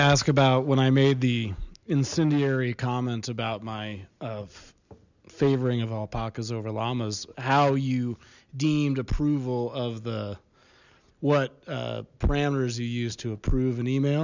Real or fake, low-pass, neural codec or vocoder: real; 7.2 kHz; none